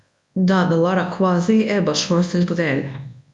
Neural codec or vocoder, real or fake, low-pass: codec, 24 kHz, 0.9 kbps, WavTokenizer, large speech release; fake; 10.8 kHz